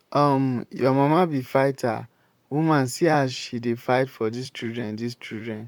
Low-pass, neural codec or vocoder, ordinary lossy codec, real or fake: 19.8 kHz; vocoder, 44.1 kHz, 128 mel bands, Pupu-Vocoder; none; fake